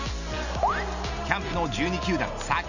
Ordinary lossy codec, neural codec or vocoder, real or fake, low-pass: none; none; real; 7.2 kHz